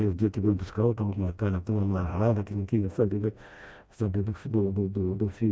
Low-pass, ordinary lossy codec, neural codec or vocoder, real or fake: none; none; codec, 16 kHz, 1 kbps, FreqCodec, smaller model; fake